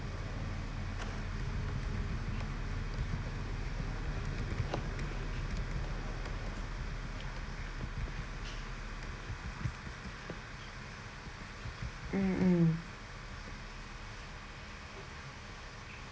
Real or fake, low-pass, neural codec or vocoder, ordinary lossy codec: real; none; none; none